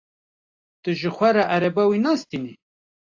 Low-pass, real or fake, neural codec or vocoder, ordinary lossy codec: 7.2 kHz; real; none; AAC, 48 kbps